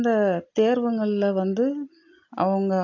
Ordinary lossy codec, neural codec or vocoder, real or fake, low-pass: AAC, 48 kbps; none; real; 7.2 kHz